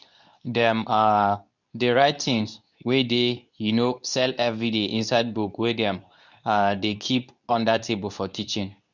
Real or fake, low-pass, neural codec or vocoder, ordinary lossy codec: fake; 7.2 kHz; codec, 24 kHz, 0.9 kbps, WavTokenizer, medium speech release version 2; none